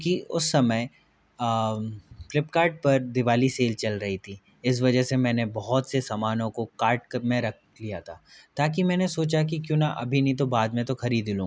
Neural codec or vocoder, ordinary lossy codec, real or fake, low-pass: none; none; real; none